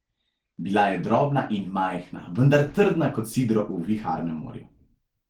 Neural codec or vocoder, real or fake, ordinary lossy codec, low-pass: none; real; Opus, 16 kbps; 19.8 kHz